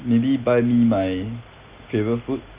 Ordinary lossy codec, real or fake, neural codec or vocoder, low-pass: Opus, 32 kbps; real; none; 3.6 kHz